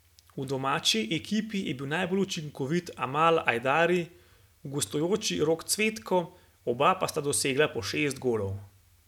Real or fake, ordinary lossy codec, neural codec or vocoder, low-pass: real; none; none; 19.8 kHz